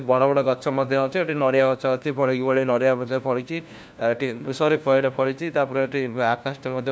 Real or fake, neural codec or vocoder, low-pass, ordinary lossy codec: fake; codec, 16 kHz, 1 kbps, FunCodec, trained on LibriTTS, 50 frames a second; none; none